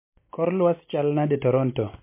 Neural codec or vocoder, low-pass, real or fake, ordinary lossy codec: none; 3.6 kHz; real; MP3, 24 kbps